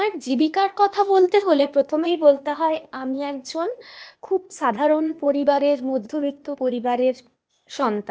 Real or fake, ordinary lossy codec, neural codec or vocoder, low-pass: fake; none; codec, 16 kHz, 0.8 kbps, ZipCodec; none